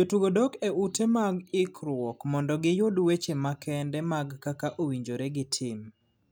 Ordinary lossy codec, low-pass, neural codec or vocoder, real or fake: none; none; none; real